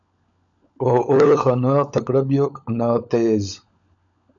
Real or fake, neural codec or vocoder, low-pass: fake; codec, 16 kHz, 16 kbps, FunCodec, trained on LibriTTS, 50 frames a second; 7.2 kHz